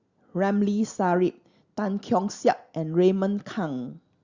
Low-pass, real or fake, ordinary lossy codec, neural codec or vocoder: 7.2 kHz; real; Opus, 64 kbps; none